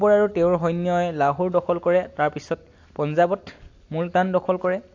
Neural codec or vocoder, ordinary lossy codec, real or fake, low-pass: none; AAC, 48 kbps; real; 7.2 kHz